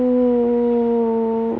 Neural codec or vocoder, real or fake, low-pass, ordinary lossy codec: none; real; none; none